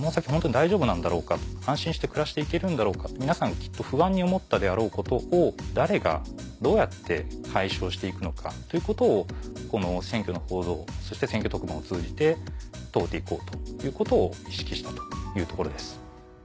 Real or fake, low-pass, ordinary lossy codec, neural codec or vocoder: real; none; none; none